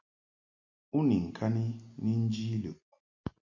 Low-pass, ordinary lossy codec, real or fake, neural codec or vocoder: 7.2 kHz; MP3, 48 kbps; real; none